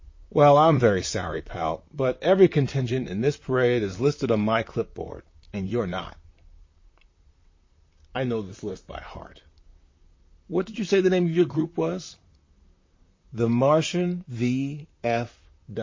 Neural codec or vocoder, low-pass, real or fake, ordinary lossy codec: vocoder, 44.1 kHz, 128 mel bands, Pupu-Vocoder; 7.2 kHz; fake; MP3, 32 kbps